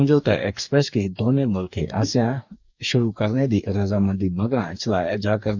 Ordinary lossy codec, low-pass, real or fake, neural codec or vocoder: none; 7.2 kHz; fake; codec, 44.1 kHz, 2.6 kbps, DAC